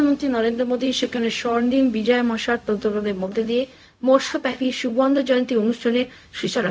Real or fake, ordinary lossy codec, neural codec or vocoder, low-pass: fake; none; codec, 16 kHz, 0.4 kbps, LongCat-Audio-Codec; none